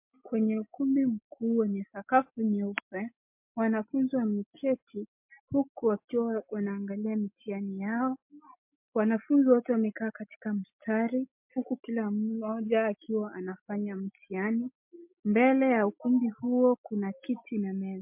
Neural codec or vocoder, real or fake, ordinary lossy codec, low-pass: none; real; MP3, 32 kbps; 3.6 kHz